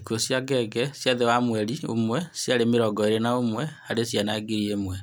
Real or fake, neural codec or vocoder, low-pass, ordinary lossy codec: real; none; none; none